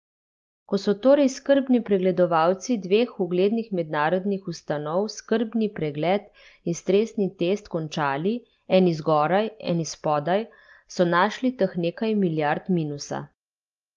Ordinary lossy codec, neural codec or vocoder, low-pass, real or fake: Opus, 32 kbps; none; 7.2 kHz; real